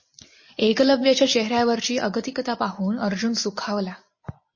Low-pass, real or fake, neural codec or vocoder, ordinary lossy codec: 7.2 kHz; real; none; MP3, 32 kbps